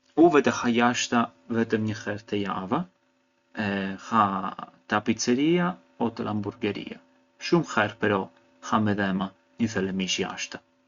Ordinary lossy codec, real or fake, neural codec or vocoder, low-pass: Opus, 64 kbps; real; none; 7.2 kHz